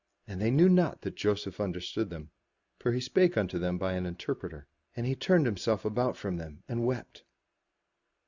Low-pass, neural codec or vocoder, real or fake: 7.2 kHz; none; real